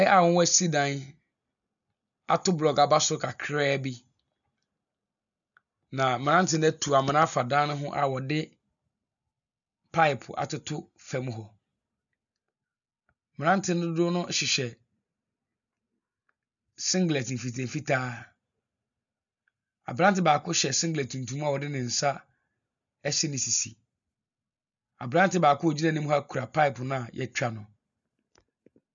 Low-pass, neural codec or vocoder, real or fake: 7.2 kHz; none; real